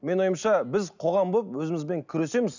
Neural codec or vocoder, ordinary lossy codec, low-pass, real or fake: none; none; 7.2 kHz; real